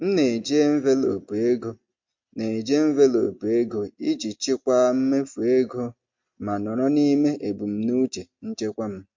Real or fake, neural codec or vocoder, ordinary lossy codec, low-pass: real; none; MP3, 48 kbps; 7.2 kHz